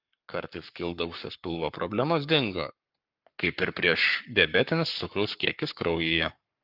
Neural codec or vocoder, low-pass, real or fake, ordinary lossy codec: codec, 44.1 kHz, 7.8 kbps, Pupu-Codec; 5.4 kHz; fake; Opus, 32 kbps